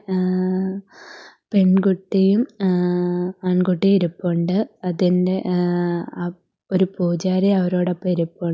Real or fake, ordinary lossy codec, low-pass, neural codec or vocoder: real; none; none; none